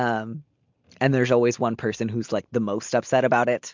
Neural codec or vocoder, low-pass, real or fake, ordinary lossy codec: none; 7.2 kHz; real; MP3, 64 kbps